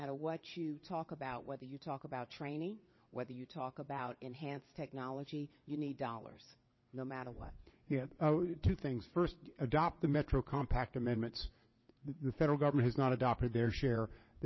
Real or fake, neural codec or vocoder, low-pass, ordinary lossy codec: fake; vocoder, 22.05 kHz, 80 mel bands, WaveNeXt; 7.2 kHz; MP3, 24 kbps